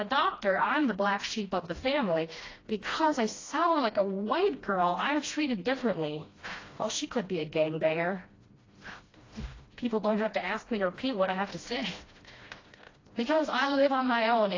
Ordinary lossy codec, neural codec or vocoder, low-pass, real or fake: AAC, 32 kbps; codec, 16 kHz, 1 kbps, FreqCodec, smaller model; 7.2 kHz; fake